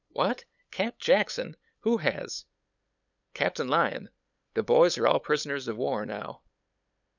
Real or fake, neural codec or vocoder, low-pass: fake; codec, 16 kHz, 8 kbps, FunCodec, trained on LibriTTS, 25 frames a second; 7.2 kHz